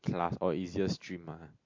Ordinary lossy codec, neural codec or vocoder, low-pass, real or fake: MP3, 48 kbps; none; 7.2 kHz; real